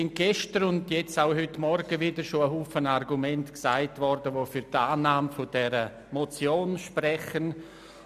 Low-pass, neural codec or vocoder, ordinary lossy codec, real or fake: 14.4 kHz; none; none; real